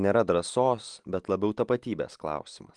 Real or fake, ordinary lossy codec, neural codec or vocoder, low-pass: real; Opus, 24 kbps; none; 10.8 kHz